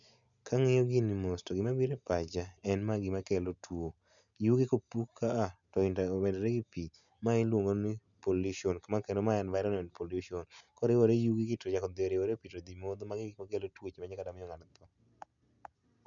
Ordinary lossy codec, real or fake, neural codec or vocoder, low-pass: none; real; none; 7.2 kHz